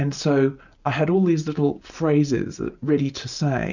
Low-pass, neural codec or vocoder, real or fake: 7.2 kHz; none; real